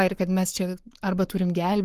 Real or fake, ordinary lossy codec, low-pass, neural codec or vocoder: fake; Opus, 24 kbps; 14.4 kHz; codec, 44.1 kHz, 7.8 kbps, Pupu-Codec